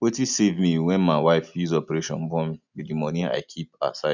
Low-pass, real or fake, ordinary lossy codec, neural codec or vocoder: 7.2 kHz; real; none; none